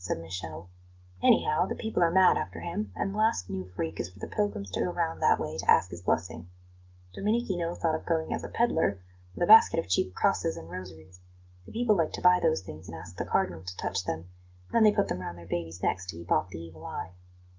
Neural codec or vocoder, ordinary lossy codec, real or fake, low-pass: none; Opus, 32 kbps; real; 7.2 kHz